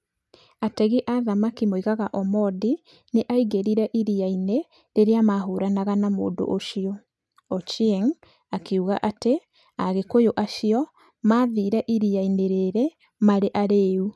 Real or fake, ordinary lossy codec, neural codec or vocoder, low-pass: real; none; none; none